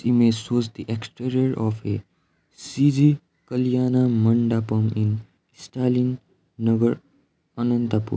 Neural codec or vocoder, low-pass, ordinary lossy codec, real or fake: none; none; none; real